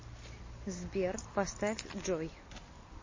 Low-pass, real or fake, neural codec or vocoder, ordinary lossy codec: 7.2 kHz; real; none; MP3, 32 kbps